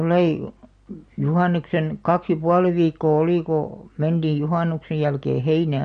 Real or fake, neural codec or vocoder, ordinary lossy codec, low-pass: real; none; MP3, 48 kbps; 9.9 kHz